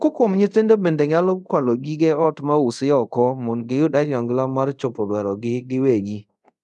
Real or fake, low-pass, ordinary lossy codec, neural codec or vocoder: fake; none; none; codec, 24 kHz, 0.5 kbps, DualCodec